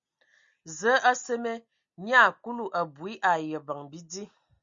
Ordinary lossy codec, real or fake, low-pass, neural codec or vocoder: Opus, 64 kbps; real; 7.2 kHz; none